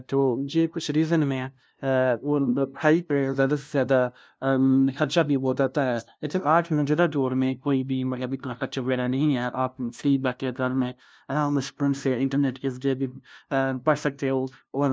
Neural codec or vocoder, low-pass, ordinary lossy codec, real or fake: codec, 16 kHz, 0.5 kbps, FunCodec, trained on LibriTTS, 25 frames a second; none; none; fake